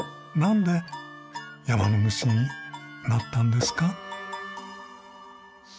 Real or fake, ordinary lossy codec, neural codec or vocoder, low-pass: real; none; none; none